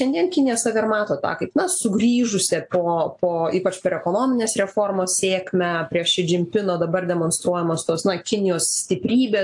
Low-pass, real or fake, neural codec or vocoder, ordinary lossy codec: 10.8 kHz; real; none; AAC, 64 kbps